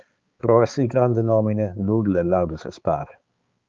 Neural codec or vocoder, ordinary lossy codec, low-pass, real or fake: codec, 16 kHz, 4 kbps, X-Codec, HuBERT features, trained on balanced general audio; Opus, 32 kbps; 7.2 kHz; fake